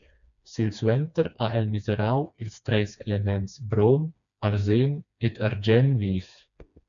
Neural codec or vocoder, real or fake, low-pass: codec, 16 kHz, 2 kbps, FreqCodec, smaller model; fake; 7.2 kHz